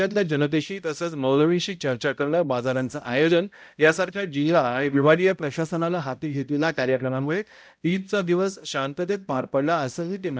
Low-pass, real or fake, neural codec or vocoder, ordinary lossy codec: none; fake; codec, 16 kHz, 0.5 kbps, X-Codec, HuBERT features, trained on balanced general audio; none